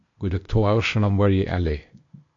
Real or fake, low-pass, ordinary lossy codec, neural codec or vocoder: fake; 7.2 kHz; MP3, 48 kbps; codec, 16 kHz, 0.8 kbps, ZipCodec